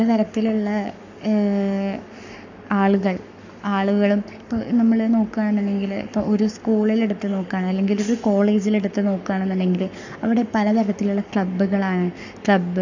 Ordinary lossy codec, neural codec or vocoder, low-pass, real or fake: none; codec, 44.1 kHz, 7.8 kbps, Pupu-Codec; 7.2 kHz; fake